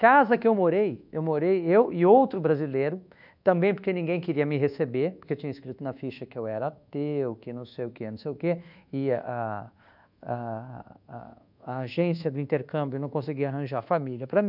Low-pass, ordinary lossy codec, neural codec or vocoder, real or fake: 5.4 kHz; none; codec, 24 kHz, 1.2 kbps, DualCodec; fake